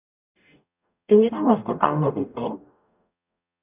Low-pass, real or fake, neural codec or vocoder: 3.6 kHz; fake; codec, 44.1 kHz, 0.9 kbps, DAC